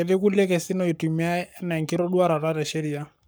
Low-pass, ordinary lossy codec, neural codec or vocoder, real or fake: none; none; codec, 44.1 kHz, 7.8 kbps, Pupu-Codec; fake